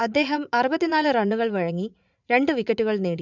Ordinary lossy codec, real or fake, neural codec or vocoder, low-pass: none; fake; vocoder, 44.1 kHz, 80 mel bands, Vocos; 7.2 kHz